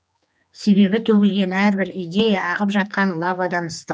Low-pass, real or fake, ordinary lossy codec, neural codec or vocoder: none; fake; none; codec, 16 kHz, 2 kbps, X-Codec, HuBERT features, trained on general audio